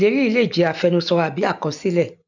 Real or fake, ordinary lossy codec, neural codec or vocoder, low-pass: real; none; none; 7.2 kHz